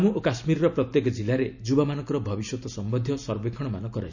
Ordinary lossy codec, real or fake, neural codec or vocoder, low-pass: none; real; none; 7.2 kHz